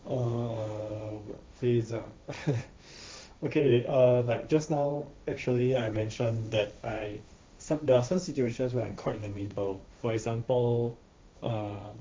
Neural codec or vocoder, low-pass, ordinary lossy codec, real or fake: codec, 16 kHz, 1.1 kbps, Voila-Tokenizer; none; none; fake